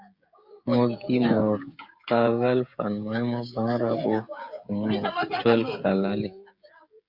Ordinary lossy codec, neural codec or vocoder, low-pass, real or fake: Opus, 64 kbps; codec, 16 kHz, 16 kbps, FreqCodec, smaller model; 5.4 kHz; fake